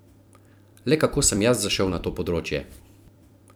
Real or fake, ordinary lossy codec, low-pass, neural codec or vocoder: fake; none; none; vocoder, 44.1 kHz, 128 mel bands every 256 samples, BigVGAN v2